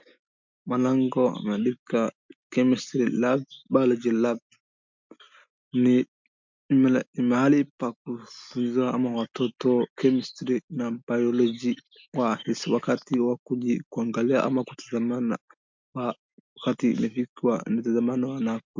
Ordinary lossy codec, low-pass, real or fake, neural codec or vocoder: MP3, 64 kbps; 7.2 kHz; real; none